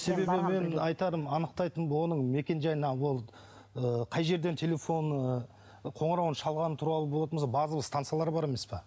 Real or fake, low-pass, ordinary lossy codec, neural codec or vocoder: real; none; none; none